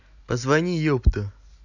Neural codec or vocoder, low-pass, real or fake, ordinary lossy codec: none; 7.2 kHz; real; none